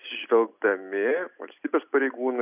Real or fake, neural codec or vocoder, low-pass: real; none; 3.6 kHz